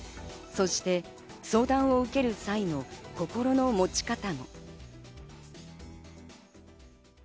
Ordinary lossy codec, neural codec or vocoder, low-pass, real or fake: none; none; none; real